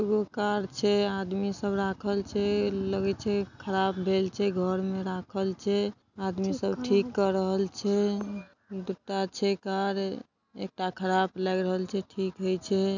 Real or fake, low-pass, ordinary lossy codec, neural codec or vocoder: real; 7.2 kHz; none; none